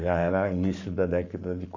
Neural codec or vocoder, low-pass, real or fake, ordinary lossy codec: codec, 44.1 kHz, 7.8 kbps, Pupu-Codec; 7.2 kHz; fake; none